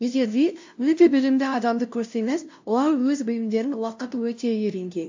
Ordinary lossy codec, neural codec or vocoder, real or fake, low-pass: AAC, 48 kbps; codec, 16 kHz, 0.5 kbps, FunCodec, trained on LibriTTS, 25 frames a second; fake; 7.2 kHz